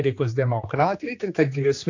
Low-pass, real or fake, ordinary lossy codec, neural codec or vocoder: 7.2 kHz; fake; AAC, 48 kbps; codec, 16 kHz, 2 kbps, X-Codec, HuBERT features, trained on general audio